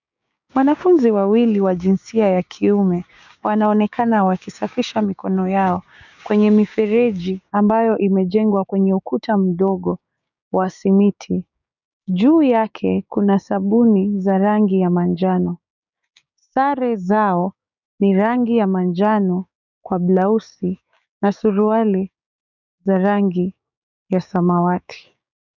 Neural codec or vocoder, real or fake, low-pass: codec, 16 kHz, 6 kbps, DAC; fake; 7.2 kHz